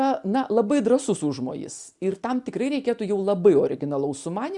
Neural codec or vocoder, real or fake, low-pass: none; real; 10.8 kHz